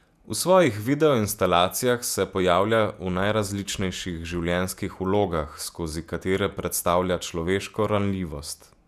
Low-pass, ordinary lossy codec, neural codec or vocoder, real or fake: 14.4 kHz; none; vocoder, 48 kHz, 128 mel bands, Vocos; fake